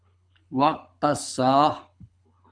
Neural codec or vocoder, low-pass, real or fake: codec, 24 kHz, 6 kbps, HILCodec; 9.9 kHz; fake